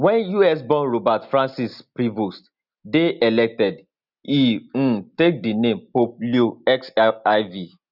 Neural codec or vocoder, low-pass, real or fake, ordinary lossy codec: none; 5.4 kHz; real; none